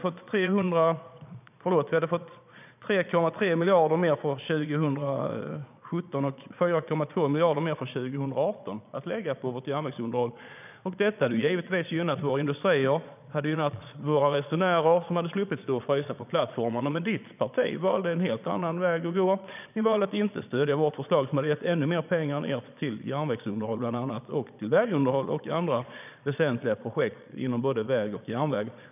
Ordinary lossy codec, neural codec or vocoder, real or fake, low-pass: none; vocoder, 22.05 kHz, 80 mel bands, Vocos; fake; 3.6 kHz